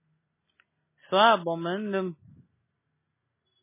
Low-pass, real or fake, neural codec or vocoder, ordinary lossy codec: 3.6 kHz; real; none; MP3, 16 kbps